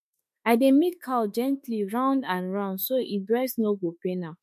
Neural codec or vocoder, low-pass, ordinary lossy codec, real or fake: autoencoder, 48 kHz, 32 numbers a frame, DAC-VAE, trained on Japanese speech; 14.4 kHz; MP3, 64 kbps; fake